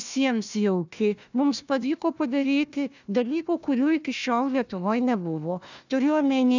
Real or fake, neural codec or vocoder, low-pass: fake; codec, 16 kHz, 1 kbps, FunCodec, trained on Chinese and English, 50 frames a second; 7.2 kHz